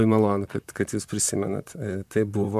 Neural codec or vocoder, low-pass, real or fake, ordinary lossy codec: vocoder, 44.1 kHz, 128 mel bands, Pupu-Vocoder; 14.4 kHz; fake; MP3, 96 kbps